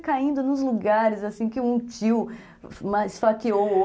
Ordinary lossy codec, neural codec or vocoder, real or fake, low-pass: none; none; real; none